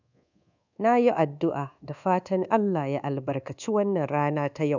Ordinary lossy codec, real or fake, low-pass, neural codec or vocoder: none; fake; 7.2 kHz; codec, 24 kHz, 1.2 kbps, DualCodec